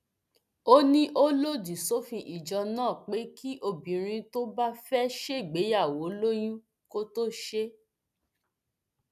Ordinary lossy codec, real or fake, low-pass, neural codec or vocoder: none; real; 14.4 kHz; none